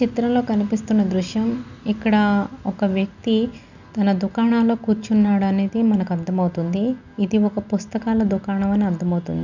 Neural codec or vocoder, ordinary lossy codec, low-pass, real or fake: none; none; 7.2 kHz; real